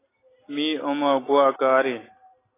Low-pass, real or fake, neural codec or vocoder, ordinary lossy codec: 3.6 kHz; real; none; AAC, 16 kbps